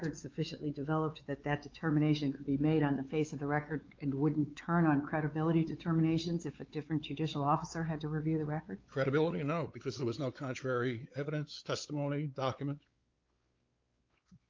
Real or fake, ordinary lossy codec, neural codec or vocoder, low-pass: fake; Opus, 24 kbps; codec, 16 kHz, 4 kbps, X-Codec, WavLM features, trained on Multilingual LibriSpeech; 7.2 kHz